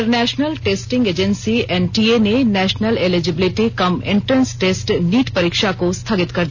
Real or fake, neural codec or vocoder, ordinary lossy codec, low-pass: real; none; none; 7.2 kHz